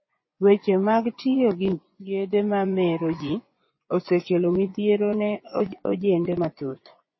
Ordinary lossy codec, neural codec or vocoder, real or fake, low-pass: MP3, 24 kbps; vocoder, 24 kHz, 100 mel bands, Vocos; fake; 7.2 kHz